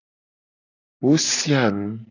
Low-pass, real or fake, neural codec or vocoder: 7.2 kHz; real; none